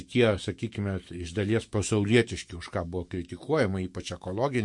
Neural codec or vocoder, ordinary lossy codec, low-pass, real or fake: autoencoder, 48 kHz, 128 numbers a frame, DAC-VAE, trained on Japanese speech; MP3, 48 kbps; 10.8 kHz; fake